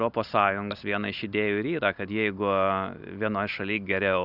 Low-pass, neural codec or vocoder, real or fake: 5.4 kHz; none; real